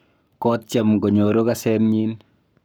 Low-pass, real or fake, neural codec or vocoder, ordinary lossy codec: none; fake; codec, 44.1 kHz, 7.8 kbps, Pupu-Codec; none